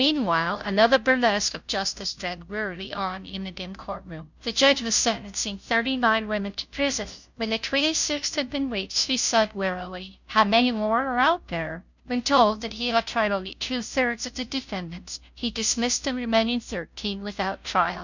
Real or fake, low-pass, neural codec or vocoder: fake; 7.2 kHz; codec, 16 kHz, 0.5 kbps, FunCodec, trained on Chinese and English, 25 frames a second